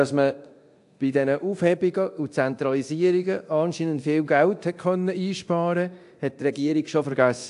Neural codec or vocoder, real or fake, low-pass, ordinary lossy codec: codec, 24 kHz, 0.9 kbps, DualCodec; fake; 10.8 kHz; AAC, 64 kbps